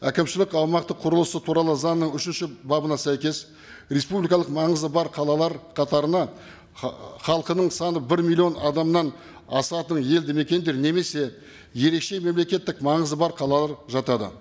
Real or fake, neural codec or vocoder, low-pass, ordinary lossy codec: real; none; none; none